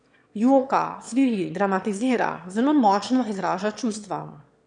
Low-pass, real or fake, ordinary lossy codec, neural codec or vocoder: 9.9 kHz; fake; none; autoencoder, 22.05 kHz, a latent of 192 numbers a frame, VITS, trained on one speaker